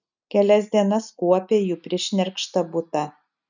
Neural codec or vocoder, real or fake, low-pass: none; real; 7.2 kHz